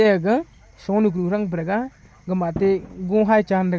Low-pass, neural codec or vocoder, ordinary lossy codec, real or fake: none; none; none; real